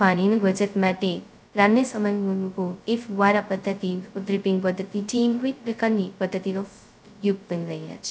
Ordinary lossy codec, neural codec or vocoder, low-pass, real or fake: none; codec, 16 kHz, 0.2 kbps, FocalCodec; none; fake